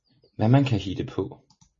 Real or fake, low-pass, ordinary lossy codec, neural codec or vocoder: real; 7.2 kHz; MP3, 32 kbps; none